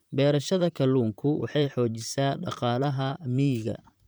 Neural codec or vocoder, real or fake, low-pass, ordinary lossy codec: vocoder, 44.1 kHz, 128 mel bands, Pupu-Vocoder; fake; none; none